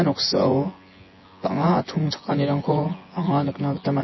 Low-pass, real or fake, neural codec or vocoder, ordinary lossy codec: 7.2 kHz; fake; vocoder, 24 kHz, 100 mel bands, Vocos; MP3, 24 kbps